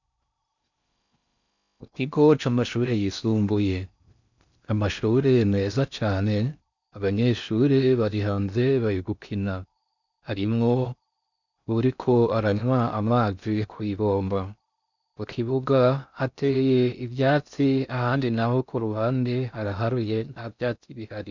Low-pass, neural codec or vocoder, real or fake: 7.2 kHz; codec, 16 kHz in and 24 kHz out, 0.6 kbps, FocalCodec, streaming, 4096 codes; fake